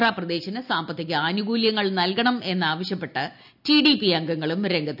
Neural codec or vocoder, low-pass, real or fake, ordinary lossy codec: none; 5.4 kHz; real; none